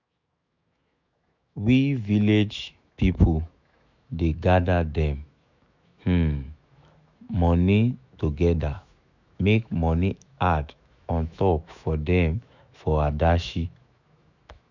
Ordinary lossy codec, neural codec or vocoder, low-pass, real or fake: none; autoencoder, 48 kHz, 128 numbers a frame, DAC-VAE, trained on Japanese speech; 7.2 kHz; fake